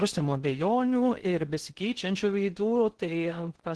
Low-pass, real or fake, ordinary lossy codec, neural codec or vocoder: 10.8 kHz; fake; Opus, 16 kbps; codec, 16 kHz in and 24 kHz out, 0.6 kbps, FocalCodec, streaming, 2048 codes